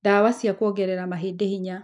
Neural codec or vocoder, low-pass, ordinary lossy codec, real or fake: none; 10.8 kHz; none; real